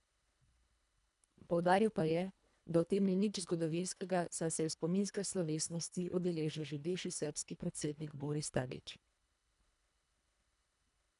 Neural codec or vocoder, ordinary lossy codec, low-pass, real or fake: codec, 24 kHz, 1.5 kbps, HILCodec; none; 10.8 kHz; fake